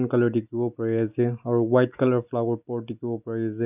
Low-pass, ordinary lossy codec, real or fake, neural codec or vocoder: 3.6 kHz; none; real; none